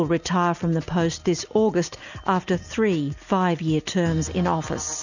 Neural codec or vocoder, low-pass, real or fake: none; 7.2 kHz; real